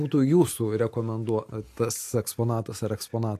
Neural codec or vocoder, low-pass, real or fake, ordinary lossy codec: none; 14.4 kHz; real; AAC, 64 kbps